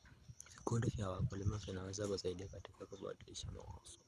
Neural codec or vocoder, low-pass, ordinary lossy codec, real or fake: codec, 24 kHz, 6 kbps, HILCodec; none; none; fake